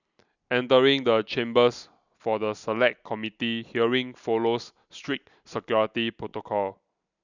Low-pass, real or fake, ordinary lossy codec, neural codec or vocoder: 7.2 kHz; real; none; none